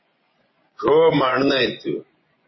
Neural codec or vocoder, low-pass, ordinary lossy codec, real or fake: vocoder, 24 kHz, 100 mel bands, Vocos; 7.2 kHz; MP3, 24 kbps; fake